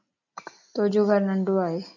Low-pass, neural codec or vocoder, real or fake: 7.2 kHz; none; real